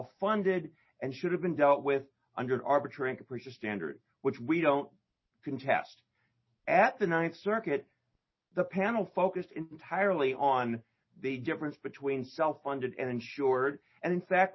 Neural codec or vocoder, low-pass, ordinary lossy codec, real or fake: none; 7.2 kHz; MP3, 24 kbps; real